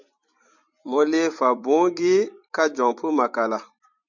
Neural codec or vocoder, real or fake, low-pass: vocoder, 44.1 kHz, 128 mel bands every 256 samples, BigVGAN v2; fake; 7.2 kHz